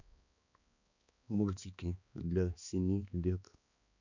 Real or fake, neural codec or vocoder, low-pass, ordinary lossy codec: fake; codec, 16 kHz, 2 kbps, X-Codec, HuBERT features, trained on balanced general audio; 7.2 kHz; none